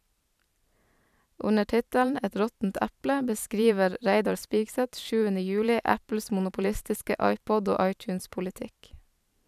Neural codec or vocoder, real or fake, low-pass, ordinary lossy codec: vocoder, 44.1 kHz, 128 mel bands every 512 samples, BigVGAN v2; fake; 14.4 kHz; none